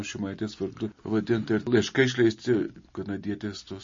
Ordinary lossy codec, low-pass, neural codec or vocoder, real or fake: MP3, 32 kbps; 7.2 kHz; none; real